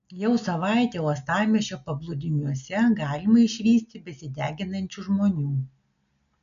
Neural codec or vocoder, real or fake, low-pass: none; real; 7.2 kHz